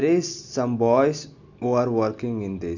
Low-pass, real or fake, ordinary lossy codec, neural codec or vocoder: 7.2 kHz; real; none; none